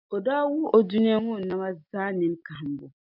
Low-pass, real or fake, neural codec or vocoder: 5.4 kHz; real; none